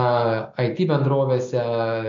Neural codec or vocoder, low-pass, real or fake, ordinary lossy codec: none; 7.2 kHz; real; MP3, 48 kbps